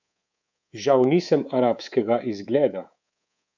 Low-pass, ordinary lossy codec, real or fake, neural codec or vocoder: 7.2 kHz; none; fake; codec, 24 kHz, 3.1 kbps, DualCodec